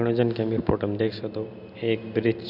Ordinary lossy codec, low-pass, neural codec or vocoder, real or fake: none; 5.4 kHz; none; real